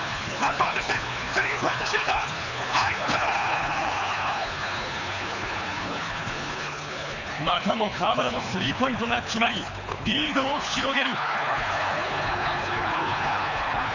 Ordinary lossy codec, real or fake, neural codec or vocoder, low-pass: none; fake; codec, 24 kHz, 3 kbps, HILCodec; 7.2 kHz